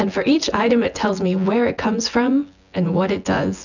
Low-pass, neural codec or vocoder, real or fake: 7.2 kHz; vocoder, 24 kHz, 100 mel bands, Vocos; fake